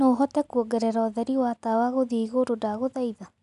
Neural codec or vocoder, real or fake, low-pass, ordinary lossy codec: none; real; 10.8 kHz; MP3, 96 kbps